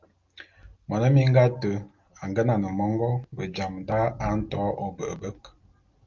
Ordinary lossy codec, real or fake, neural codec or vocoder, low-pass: Opus, 32 kbps; real; none; 7.2 kHz